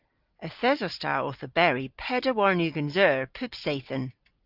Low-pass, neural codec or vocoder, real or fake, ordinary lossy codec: 5.4 kHz; none; real; Opus, 32 kbps